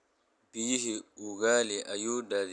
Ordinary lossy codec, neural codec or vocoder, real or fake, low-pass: none; none; real; 10.8 kHz